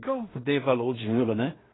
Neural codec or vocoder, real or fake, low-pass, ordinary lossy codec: codec, 16 kHz in and 24 kHz out, 0.4 kbps, LongCat-Audio-Codec, two codebook decoder; fake; 7.2 kHz; AAC, 16 kbps